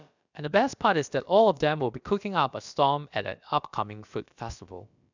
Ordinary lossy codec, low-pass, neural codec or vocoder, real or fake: none; 7.2 kHz; codec, 16 kHz, about 1 kbps, DyCAST, with the encoder's durations; fake